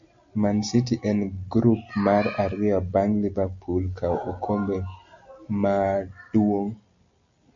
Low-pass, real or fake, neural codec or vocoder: 7.2 kHz; real; none